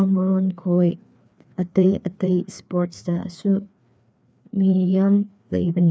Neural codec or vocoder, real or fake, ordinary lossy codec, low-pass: codec, 16 kHz, 2 kbps, FreqCodec, larger model; fake; none; none